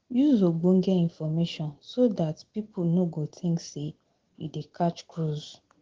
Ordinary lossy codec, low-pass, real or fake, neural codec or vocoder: Opus, 16 kbps; 7.2 kHz; real; none